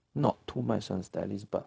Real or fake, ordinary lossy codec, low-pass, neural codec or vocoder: fake; none; none; codec, 16 kHz, 0.4 kbps, LongCat-Audio-Codec